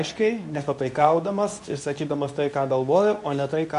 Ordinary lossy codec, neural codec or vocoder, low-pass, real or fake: MP3, 64 kbps; codec, 24 kHz, 0.9 kbps, WavTokenizer, medium speech release version 2; 10.8 kHz; fake